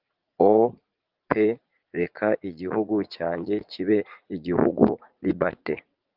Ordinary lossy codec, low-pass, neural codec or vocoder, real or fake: Opus, 32 kbps; 5.4 kHz; vocoder, 44.1 kHz, 80 mel bands, Vocos; fake